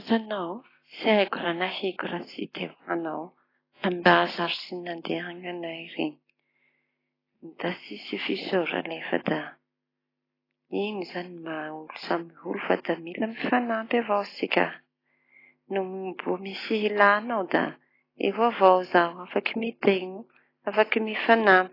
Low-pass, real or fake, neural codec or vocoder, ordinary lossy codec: 5.4 kHz; real; none; AAC, 24 kbps